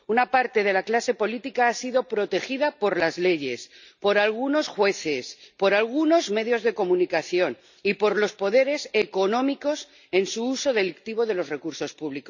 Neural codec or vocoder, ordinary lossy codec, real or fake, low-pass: none; none; real; 7.2 kHz